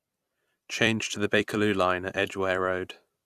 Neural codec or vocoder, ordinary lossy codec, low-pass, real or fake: vocoder, 44.1 kHz, 128 mel bands every 256 samples, BigVGAN v2; Opus, 64 kbps; 14.4 kHz; fake